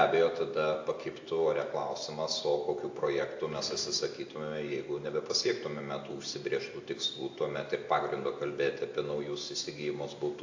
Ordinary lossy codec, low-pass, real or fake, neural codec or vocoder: AAC, 48 kbps; 7.2 kHz; real; none